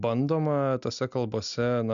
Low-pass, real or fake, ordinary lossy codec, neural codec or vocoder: 7.2 kHz; real; AAC, 96 kbps; none